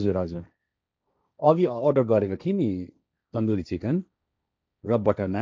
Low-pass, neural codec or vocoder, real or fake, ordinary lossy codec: none; codec, 16 kHz, 1.1 kbps, Voila-Tokenizer; fake; none